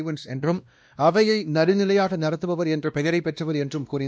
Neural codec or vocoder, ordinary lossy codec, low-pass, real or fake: codec, 16 kHz, 1 kbps, X-Codec, WavLM features, trained on Multilingual LibriSpeech; none; none; fake